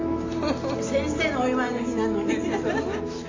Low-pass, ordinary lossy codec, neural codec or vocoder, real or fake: 7.2 kHz; AAC, 32 kbps; none; real